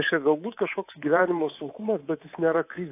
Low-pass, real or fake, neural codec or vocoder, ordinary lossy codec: 3.6 kHz; fake; codec, 24 kHz, 3.1 kbps, DualCodec; AAC, 24 kbps